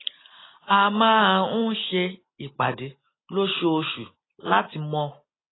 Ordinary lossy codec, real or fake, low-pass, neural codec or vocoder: AAC, 16 kbps; real; 7.2 kHz; none